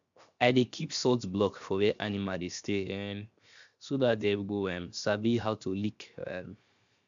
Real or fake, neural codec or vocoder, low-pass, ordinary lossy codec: fake; codec, 16 kHz, 0.7 kbps, FocalCodec; 7.2 kHz; MP3, 64 kbps